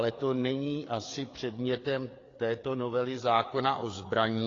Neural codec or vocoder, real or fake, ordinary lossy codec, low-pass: codec, 16 kHz, 4 kbps, FreqCodec, larger model; fake; AAC, 32 kbps; 7.2 kHz